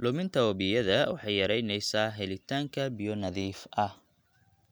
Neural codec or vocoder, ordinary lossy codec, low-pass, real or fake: none; none; none; real